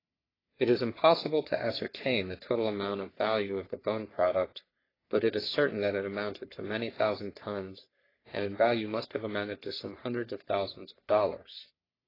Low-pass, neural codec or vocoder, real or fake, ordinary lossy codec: 5.4 kHz; codec, 44.1 kHz, 3.4 kbps, Pupu-Codec; fake; AAC, 24 kbps